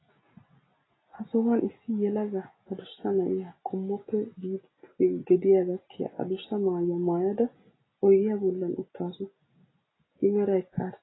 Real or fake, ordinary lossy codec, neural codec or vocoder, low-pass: real; AAC, 16 kbps; none; 7.2 kHz